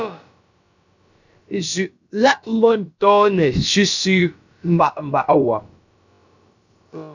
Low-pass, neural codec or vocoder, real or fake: 7.2 kHz; codec, 16 kHz, about 1 kbps, DyCAST, with the encoder's durations; fake